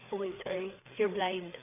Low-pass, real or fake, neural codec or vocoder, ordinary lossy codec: 3.6 kHz; fake; codec, 16 kHz, 4 kbps, FreqCodec, larger model; none